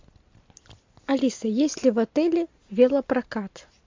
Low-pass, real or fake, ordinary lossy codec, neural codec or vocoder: 7.2 kHz; real; MP3, 64 kbps; none